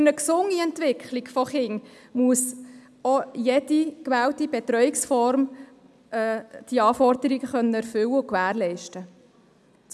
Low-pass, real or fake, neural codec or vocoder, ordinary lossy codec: none; real; none; none